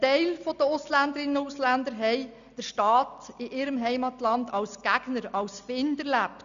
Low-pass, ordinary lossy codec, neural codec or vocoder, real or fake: 7.2 kHz; none; none; real